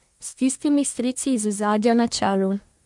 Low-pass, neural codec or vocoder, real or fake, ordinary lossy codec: 10.8 kHz; codec, 24 kHz, 1 kbps, SNAC; fake; MP3, 64 kbps